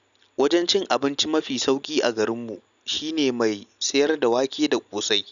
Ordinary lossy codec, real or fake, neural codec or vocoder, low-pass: none; real; none; 7.2 kHz